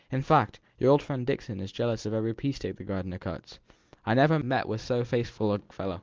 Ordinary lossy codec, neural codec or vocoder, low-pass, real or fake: Opus, 32 kbps; none; 7.2 kHz; real